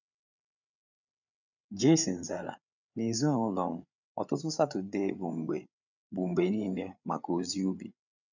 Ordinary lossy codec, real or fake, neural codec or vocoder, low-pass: none; fake; codec, 16 kHz, 8 kbps, FreqCodec, larger model; 7.2 kHz